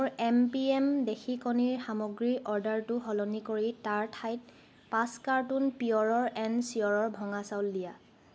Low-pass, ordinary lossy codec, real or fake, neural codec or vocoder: none; none; real; none